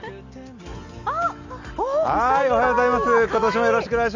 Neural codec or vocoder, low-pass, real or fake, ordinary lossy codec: none; 7.2 kHz; real; Opus, 64 kbps